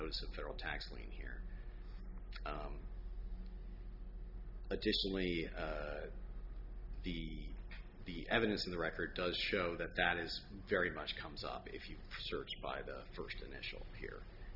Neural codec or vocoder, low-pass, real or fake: none; 5.4 kHz; real